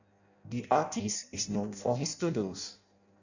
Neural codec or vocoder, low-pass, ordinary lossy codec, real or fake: codec, 16 kHz in and 24 kHz out, 0.6 kbps, FireRedTTS-2 codec; 7.2 kHz; none; fake